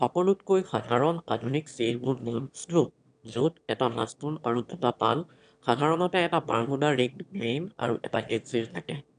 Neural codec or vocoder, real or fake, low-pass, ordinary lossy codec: autoencoder, 22.05 kHz, a latent of 192 numbers a frame, VITS, trained on one speaker; fake; 9.9 kHz; none